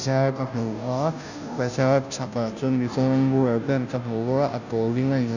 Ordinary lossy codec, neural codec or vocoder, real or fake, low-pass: none; codec, 16 kHz, 0.5 kbps, FunCodec, trained on Chinese and English, 25 frames a second; fake; 7.2 kHz